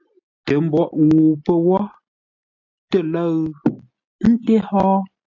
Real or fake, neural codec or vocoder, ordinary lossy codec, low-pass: real; none; AAC, 48 kbps; 7.2 kHz